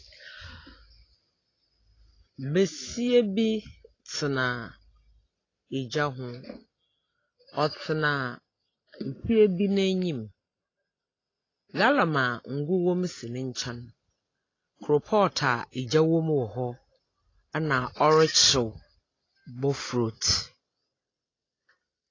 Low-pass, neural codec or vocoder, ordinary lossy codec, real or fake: 7.2 kHz; none; AAC, 32 kbps; real